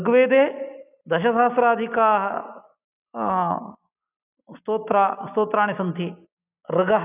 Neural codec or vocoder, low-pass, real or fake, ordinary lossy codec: none; 3.6 kHz; real; none